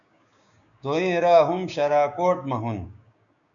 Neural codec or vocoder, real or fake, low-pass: codec, 16 kHz, 6 kbps, DAC; fake; 7.2 kHz